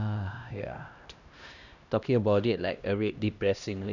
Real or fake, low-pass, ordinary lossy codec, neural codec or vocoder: fake; 7.2 kHz; none; codec, 16 kHz, 1 kbps, X-Codec, HuBERT features, trained on LibriSpeech